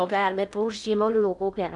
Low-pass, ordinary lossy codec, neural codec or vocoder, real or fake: 10.8 kHz; none; codec, 16 kHz in and 24 kHz out, 0.8 kbps, FocalCodec, streaming, 65536 codes; fake